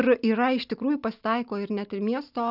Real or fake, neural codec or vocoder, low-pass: real; none; 5.4 kHz